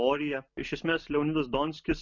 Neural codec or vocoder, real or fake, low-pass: none; real; 7.2 kHz